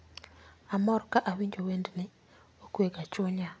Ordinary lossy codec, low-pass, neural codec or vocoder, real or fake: none; none; none; real